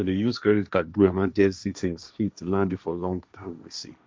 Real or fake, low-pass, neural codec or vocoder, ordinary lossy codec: fake; none; codec, 16 kHz, 1.1 kbps, Voila-Tokenizer; none